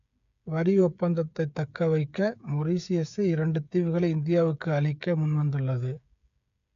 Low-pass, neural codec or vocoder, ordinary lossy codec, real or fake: 7.2 kHz; codec, 16 kHz, 8 kbps, FreqCodec, smaller model; none; fake